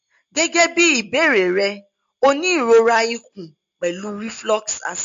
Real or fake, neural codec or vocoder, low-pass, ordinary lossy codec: real; none; 7.2 kHz; MP3, 48 kbps